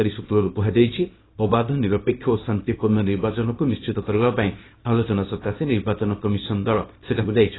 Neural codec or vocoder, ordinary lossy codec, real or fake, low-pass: codec, 24 kHz, 0.9 kbps, WavTokenizer, small release; AAC, 16 kbps; fake; 7.2 kHz